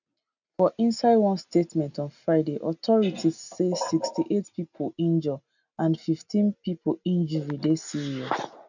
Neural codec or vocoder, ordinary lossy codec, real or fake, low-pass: none; none; real; 7.2 kHz